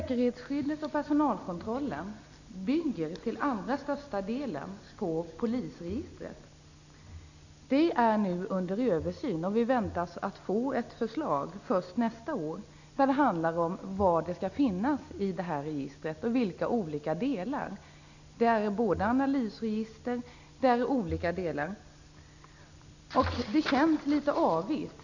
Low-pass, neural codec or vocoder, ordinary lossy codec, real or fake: 7.2 kHz; none; none; real